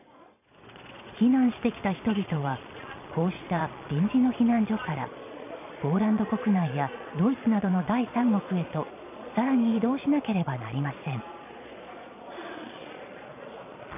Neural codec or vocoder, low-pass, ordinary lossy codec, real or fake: vocoder, 22.05 kHz, 80 mel bands, Vocos; 3.6 kHz; none; fake